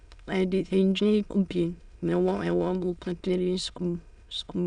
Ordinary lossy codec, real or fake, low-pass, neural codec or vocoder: none; fake; 9.9 kHz; autoencoder, 22.05 kHz, a latent of 192 numbers a frame, VITS, trained on many speakers